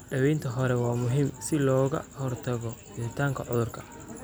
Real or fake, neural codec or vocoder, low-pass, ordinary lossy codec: real; none; none; none